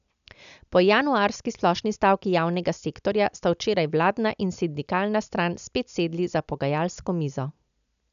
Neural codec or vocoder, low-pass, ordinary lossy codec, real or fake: none; 7.2 kHz; none; real